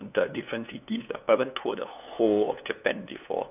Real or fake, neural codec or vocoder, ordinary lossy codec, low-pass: fake; codec, 16 kHz, 2 kbps, FunCodec, trained on LibriTTS, 25 frames a second; none; 3.6 kHz